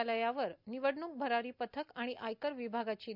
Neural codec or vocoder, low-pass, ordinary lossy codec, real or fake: none; 5.4 kHz; none; real